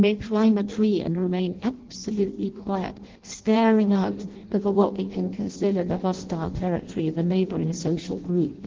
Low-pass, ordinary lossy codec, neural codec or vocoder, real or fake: 7.2 kHz; Opus, 16 kbps; codec, 16 kHz in and 24 kHz out, 0.6 kbps, FireRedTTS-2 codec; fake